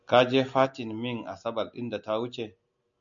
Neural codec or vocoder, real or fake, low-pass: none; real; 7.2 kHz